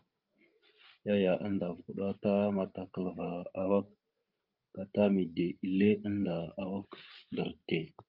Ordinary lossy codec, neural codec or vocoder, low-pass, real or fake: Opus, 24 kbps; codec, 16 kHz, 16 kbps, FreqCodec, larger model; 5.4 kHz; fake